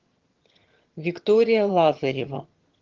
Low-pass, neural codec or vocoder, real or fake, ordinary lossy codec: 7.2 kHz; vocoder, 22.05 kHz, 80 mel bands, HiFi-GAN; fake; Opus, 16 kbps